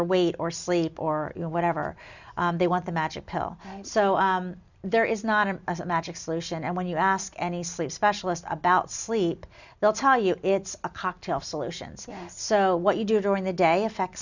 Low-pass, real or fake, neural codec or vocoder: 7.2 kHz; real; none